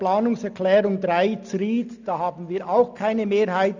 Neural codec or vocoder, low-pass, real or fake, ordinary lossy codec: none; 7.2 kHz; real; none